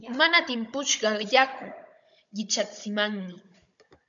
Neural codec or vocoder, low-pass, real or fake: codec, 16 kHz, 16 kbps, FunCodec, trained on Chinese and English, 50 frames a second; 7.2 kHz; fake